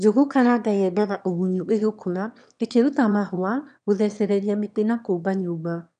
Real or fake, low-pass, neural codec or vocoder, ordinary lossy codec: fake; 9.9 kHz; autoencoder, 22.05 kHz, a latent of 192 numbers a frame, VITS, trained on one speaker; none